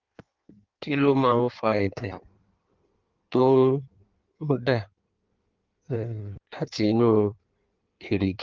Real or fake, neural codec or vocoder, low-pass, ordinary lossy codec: fake; codec, 16 kHz in and 24 kHz out, 1.1 kbps, FireRedTTS-2 codec; 7.2 kHz; Opus, 32 kbps